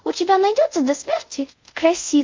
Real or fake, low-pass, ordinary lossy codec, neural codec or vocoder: fake; 7.2 kHz; MP3, 48 kbps; codec, 24 kHz, 0.5 kbps, DualCodec